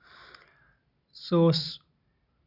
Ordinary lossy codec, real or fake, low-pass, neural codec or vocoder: none; real; 5.4 kHz; none